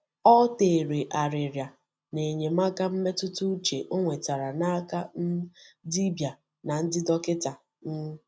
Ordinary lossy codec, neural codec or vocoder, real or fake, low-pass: none; none; real; none